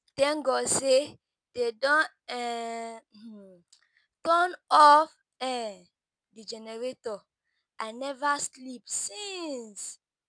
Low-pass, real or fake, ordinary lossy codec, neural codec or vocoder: 9.9 kHz; real; none; none